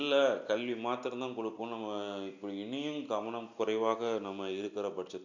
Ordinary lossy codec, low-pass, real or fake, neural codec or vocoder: none; 7.2 kHz; real; none